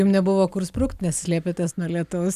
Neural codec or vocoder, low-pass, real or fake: none; 14.4 kHz; real